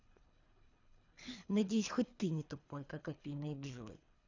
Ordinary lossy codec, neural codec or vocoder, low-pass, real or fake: none; codec, 24 kHz, 3 kbps, HILCodec; 7.2 kHz; fake